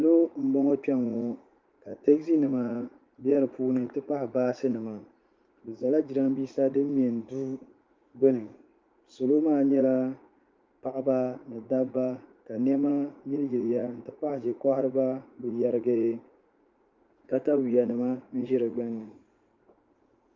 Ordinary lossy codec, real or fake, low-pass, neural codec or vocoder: Opus, 24 kbps; fake; 7.2 kHz; vocoder, 44.1 kHz, 80 mel bands, Vocos